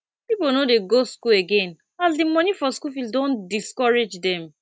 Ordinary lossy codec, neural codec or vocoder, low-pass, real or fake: none; none; none; real